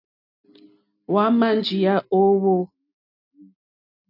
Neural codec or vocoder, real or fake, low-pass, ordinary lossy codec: none; real; 5.4 kHz; AAC, 48 kbps